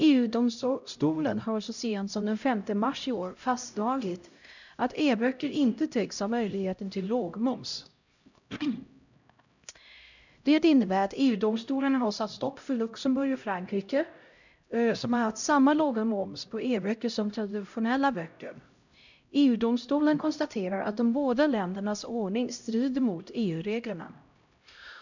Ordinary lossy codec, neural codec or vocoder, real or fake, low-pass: none; codec, 16 kHz, 0.5 kbps, X-Codec, HuBERT features, trained on LibriSpeech; fake; 7.2 kHz